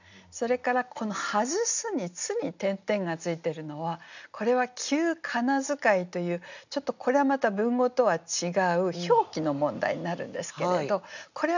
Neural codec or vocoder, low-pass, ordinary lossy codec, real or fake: none; 7.2 kHz; none; real